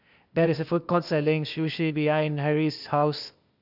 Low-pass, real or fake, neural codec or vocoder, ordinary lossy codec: 5.4 kHz; fake; codec, 16 kHz, 0.8 kbps, ZipCodec; none